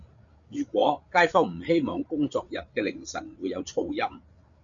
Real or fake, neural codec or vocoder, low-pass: fake; codec, 16 kHz, 8 kbps, FreqCodec, larger model; 7.2 kHz